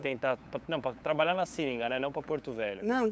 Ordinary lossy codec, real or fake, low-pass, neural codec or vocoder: none; fake; none; codec, 16 kHz, 8 kbps, FunCodec, trained on LibriTTS, 25 frames a second